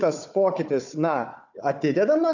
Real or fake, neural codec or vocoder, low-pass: fake; codec, 16 kHz, 4 kbps, FunCodec, trained on Chinese and English, 50 frames a second; 7.2 kHz